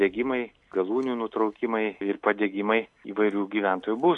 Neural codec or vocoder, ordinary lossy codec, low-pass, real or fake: none; MP3, 64 kbps; 10.8 kHz; real